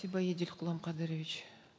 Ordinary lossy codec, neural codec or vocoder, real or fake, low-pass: none; none; real; none